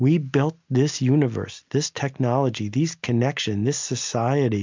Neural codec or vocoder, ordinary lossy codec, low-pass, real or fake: none; MP3, 64 kbps; 7.2 kHz; real